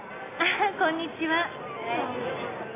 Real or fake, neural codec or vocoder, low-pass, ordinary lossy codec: real; none; 3.6 kHz; AAC, 16 kbps